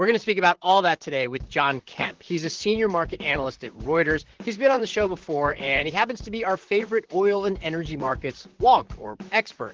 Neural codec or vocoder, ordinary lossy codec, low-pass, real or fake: vocoder, 44.1 kHz, 128 mel bands, Pupu-Vocoder; Opus, 16 kbps; 7.2 kHz; fake